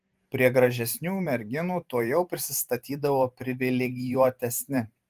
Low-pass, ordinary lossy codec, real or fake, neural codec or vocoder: 14.4 kHz; Opus, 24 kbps; fake; vocoder, 44.1 kHz, 128 mel bands every 512 samples, BigVGAN v2